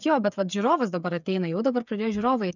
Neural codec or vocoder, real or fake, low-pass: codec, 16 kHz, 8 kbps, FreqCodec, smaller model; fake; 7.2 kHz